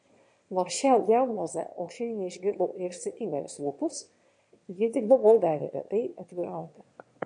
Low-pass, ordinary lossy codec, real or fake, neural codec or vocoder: 9.9 kHz; MP3, 48 kbps; fake; autoencoder, 22.05 kHz, a latent of 192 numbers a frame, VITS, trained on one speaker